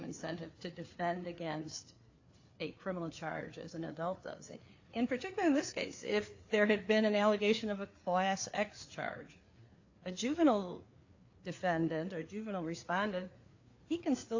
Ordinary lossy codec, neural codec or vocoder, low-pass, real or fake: AAC, 48 kbps; codec, 16 kHz, 4 kbps, FreqCodec, larger model; 7.2 kHz; fake